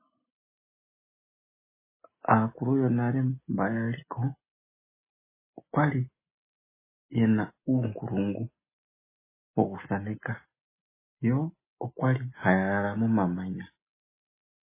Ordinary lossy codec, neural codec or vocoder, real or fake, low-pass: MP3, 16 kbps; none; real; 3.6 kHz